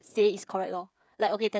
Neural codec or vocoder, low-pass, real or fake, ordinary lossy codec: codec, 16 kHz, 8 kbps, FreqCodec, smaller model; none; fake; none